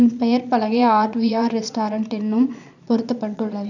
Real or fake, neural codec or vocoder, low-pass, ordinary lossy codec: fake; vocoder, 22.05 kHz, 80 mel bands, Vocos; 7.2 kHz; none